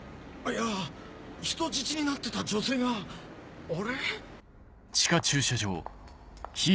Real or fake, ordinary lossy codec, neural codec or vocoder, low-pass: real; none; none; none